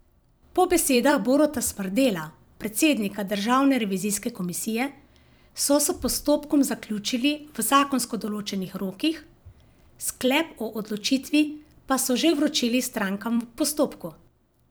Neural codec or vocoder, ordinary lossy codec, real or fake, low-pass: vocoder, 44.1 kHz, 128 mel bands every 256 samples, BigVGAN v2; none; fake; none